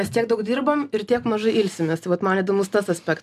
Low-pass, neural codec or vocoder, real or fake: 14.4 kHz; none; real